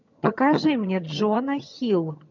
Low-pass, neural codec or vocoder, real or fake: 7.2 kHz; vocoder, 22.05 kHz, 80 mel bands, HiFi-GAN; fake